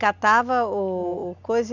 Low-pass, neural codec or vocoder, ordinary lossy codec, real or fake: 7.2 kHz; none; none; real